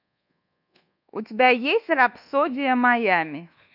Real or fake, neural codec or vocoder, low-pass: fake; codec, 24 kHz, 1.2 kbps, DualCodec; 5.4 kHz